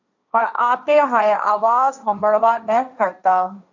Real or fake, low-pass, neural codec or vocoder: fake; 7.2 kHz; codec, 16 kHz, 1.1 kbps, Voila-Tokenizer